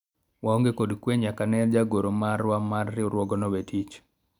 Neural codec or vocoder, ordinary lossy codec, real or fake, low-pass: none; none; real; 19.8 kHz